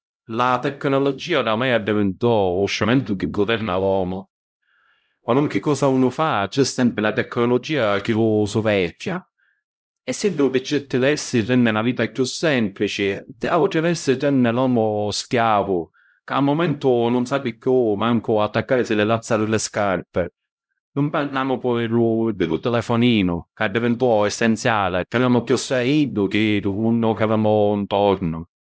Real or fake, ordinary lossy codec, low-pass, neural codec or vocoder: fake; none; none; codec, 16 kHz, 0.5 kbps, X-Codec, HuBERT features, trained on LibriSpeech